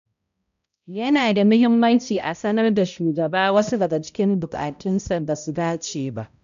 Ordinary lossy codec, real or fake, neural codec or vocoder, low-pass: none; fake; codec, 16 kHz, 0.5 kbps, X-Codec, HuBERT features, trained on balanced general audio; 7.2 kHz